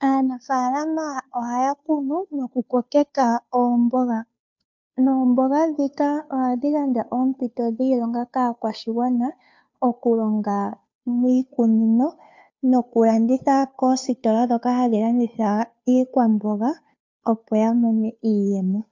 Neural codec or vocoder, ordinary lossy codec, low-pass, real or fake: codec, 16 kHz, 2 kbps, FunCodec, trained on Chinese and English, 25 frames a second; MP3, 64 kbps; 7.2 kHz; fake